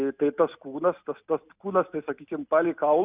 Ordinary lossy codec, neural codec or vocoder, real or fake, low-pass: Opus, 64 kbps; none; real; 3.6 kHz